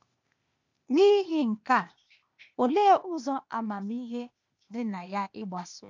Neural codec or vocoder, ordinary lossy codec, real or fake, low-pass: codec, 16 kHz, 0.8 kbps, ZipCodec; MP3, 64 kbps; fake; 7.2 kHz